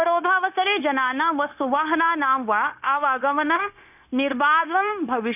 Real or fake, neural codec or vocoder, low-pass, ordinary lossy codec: fake; codec, 16 kHz, 0.9 kbps, LongCat-Audio-Codec; 3.6 kHz; none